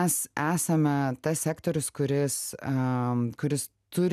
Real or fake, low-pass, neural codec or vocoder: real; 14.4 kHz; none